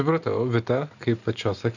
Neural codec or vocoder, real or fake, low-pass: none; real; 7.2 kHz